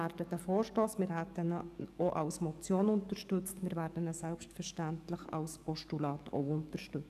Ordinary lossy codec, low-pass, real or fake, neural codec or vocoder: none; 14.4 kHz; fake; codec, 44.1 kHz, 7.8 kbps, DAC